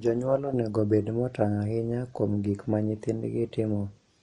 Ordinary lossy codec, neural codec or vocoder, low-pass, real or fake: MP3, 48 kbps; none; 10.8 kHz; real